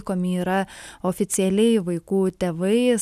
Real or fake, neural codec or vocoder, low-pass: real; none; 14.4 kHz